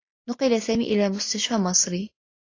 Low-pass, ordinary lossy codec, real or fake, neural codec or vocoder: 7.2 kHz; AAC, 32 kbps; real; none